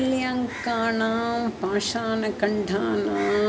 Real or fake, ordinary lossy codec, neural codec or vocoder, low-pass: real; none; none; none